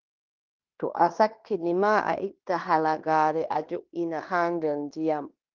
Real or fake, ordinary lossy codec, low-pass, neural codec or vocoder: fake; Opus, 24 kbps; 7.2 kHz; codec, 16 kHz in and 24 kHz out, 0.9 kbps, LongCat-Audio-Codec, fine tuned four codebook decoder